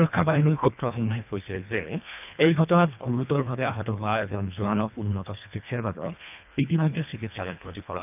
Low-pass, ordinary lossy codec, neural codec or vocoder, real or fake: 3.6 kHz; none; codec, 24 kHz, 1.5 kbps, HILCodec; fake